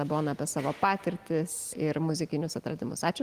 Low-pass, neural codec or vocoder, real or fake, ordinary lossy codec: 14.4 kHz; none; real; Opus, 24 kbps